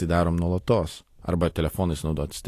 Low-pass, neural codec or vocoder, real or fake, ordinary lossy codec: 14.4 kHz; none; real; AAC, 48 kbps